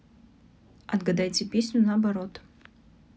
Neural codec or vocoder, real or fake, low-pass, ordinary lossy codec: none; real; none; none